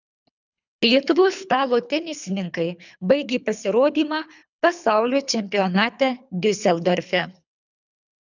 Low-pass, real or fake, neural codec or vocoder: 7.2 kHz; fake; codec, 24 kHz, 3 kbps, HILCodec